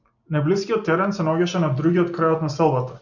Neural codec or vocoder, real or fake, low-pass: none; real; 7.2 kHz